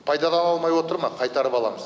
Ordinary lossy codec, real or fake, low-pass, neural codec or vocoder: none; real; none; none